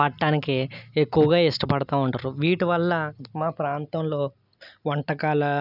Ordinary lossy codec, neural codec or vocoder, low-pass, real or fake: none; none; 5.4 kHz; real